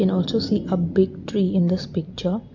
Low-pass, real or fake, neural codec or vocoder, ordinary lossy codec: 7.2 kHz; real; none; none